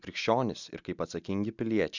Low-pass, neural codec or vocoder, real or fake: 7.2 kHz; none; real